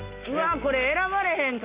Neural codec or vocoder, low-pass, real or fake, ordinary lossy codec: none; 3.6 kHz; real; Opus, 64 kbps